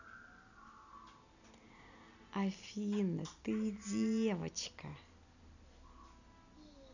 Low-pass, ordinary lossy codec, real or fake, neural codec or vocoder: 7.2 kHz; none; real; none